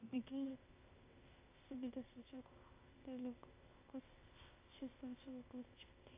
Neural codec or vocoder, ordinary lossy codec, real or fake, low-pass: codec, 16 kHz, 0.8 kbps, ZipCodec; AAC, 16 kbps; fake; 3.6 kHz